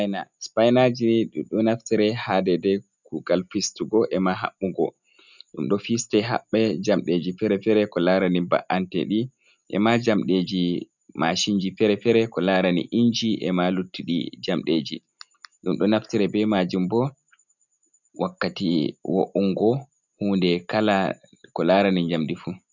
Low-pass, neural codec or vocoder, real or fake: 7.2 kHz; none; real